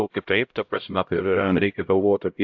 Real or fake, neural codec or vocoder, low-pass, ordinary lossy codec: fake; codec, 16 kHz, 0.5 kbps, X-Codec, HuBERT features, trained on LibriSpeech; 7.2 kHz; AAC, 48 kbps